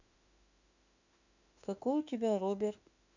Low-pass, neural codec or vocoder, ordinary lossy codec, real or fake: 7.2 kHz; autoencoder, 48 kHz, 32 numbers a frame, DAC-VAE, trained on Japanese speech; none; fake